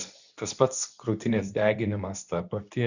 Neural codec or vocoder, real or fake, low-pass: codec, 24 kHz, 0.9 kbps, WavTokenizer, medium speech release version 2; fake; 7.2 kHz